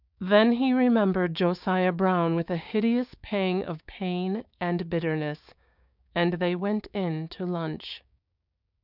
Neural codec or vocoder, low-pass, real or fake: codec, 16 kHz, 6 kbps, DAC; 5.4 kHz; fake